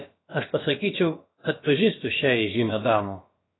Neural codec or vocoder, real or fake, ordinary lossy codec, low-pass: codec, 16 kHz, about 1 kbps, DyCAST, with the encoder's durations; fake; AAC, 16 kbps; 7.2 kHz